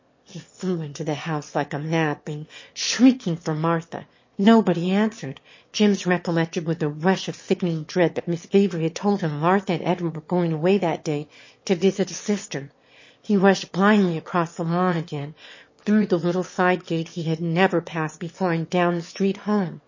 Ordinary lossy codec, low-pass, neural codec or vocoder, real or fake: MP3, 32 kbps; 7.2 kHz; autoencoder, 22.05 kHz, a latent of 192 numbers a frame, VITS, trained on one speaker; fake